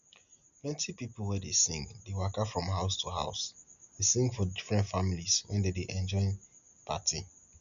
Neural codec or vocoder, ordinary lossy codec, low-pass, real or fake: none; none; 7.2 kHz; real